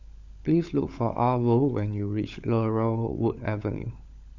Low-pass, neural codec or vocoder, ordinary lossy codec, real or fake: 7.2 kHz; codec, 16 kHz, 16 kbps, FunCodec, trained on LibriTTS, 50 frames a second; none; fake